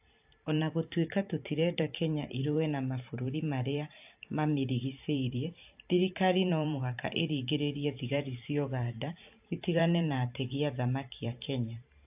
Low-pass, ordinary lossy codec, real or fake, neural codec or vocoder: 3.6 kHz; AAC, 32 kbps; fake; vocoder, 44.1 kHz, 128 mel bands every 512 samples, BigVGAN v2